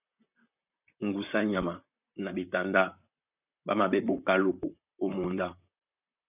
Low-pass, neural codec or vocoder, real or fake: 3.6 kHz; vocoder, 44.1 kHz, 128 mel bands, Pupu-Vocoder; fake